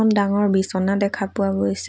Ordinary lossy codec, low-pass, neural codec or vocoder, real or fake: none; none; none; real